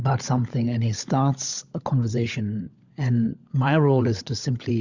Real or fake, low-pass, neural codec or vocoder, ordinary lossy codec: fake; 7.2 kHz; codec, 16 kHz, 16 kbps, FunCodec, trained on LibriTTS, 50 frames a second; Opus, 64 kbps